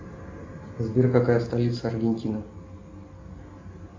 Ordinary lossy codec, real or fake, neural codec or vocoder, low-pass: AAC, 32 kbps; real; none; 7.2 kHz